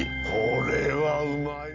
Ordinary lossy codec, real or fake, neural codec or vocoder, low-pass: none; real; none; 7.2 kHz